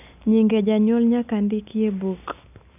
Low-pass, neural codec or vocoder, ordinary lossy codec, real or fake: 3.6 kHz; none; none; real